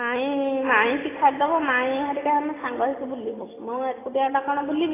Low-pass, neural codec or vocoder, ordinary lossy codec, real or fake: 3.6 kHz; none; AAC, 16 kbps; real